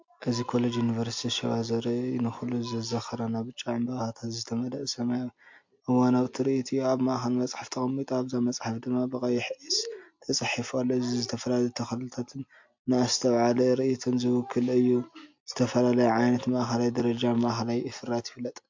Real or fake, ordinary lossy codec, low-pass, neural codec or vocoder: real; MP3, 48 kbps; 7.2 kHz; none